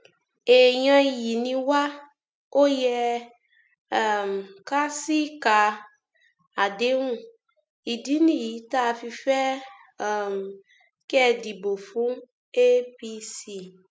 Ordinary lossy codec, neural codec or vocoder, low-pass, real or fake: none; none; none; real